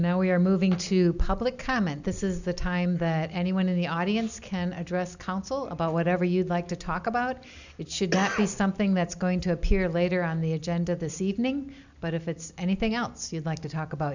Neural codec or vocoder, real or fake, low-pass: none; real; 7.2 kHz